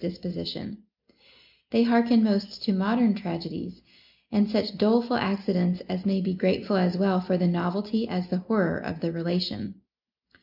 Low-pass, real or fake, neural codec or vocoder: 5.4 kHz; real; none